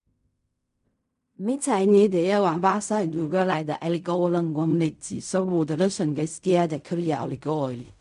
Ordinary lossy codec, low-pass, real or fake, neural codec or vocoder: none; 10.8 kHz; fake; codec, 16 kHz in and 24 kHz out, 0.4 kbps, LongCat-Audio-Codec, fine tuned four codebook decoder